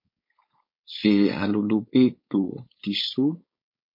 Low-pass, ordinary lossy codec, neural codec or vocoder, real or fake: 5.4 kHz; MP3, 32 kbps; codec, 16 kHz, 4.8 kbps, FACodec; fake